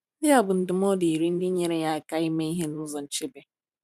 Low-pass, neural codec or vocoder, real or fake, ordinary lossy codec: 14.4 kHz; vocoder, 44.1 kHz, 128 mel bands every 512 samples, BigVGAN v2; fake; none